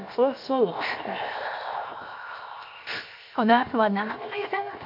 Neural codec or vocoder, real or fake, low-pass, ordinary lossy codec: codec, 16 kHz, 0.7 kbps, FocalCodec; fake; 5.4 kHz; MP3, 48 kbps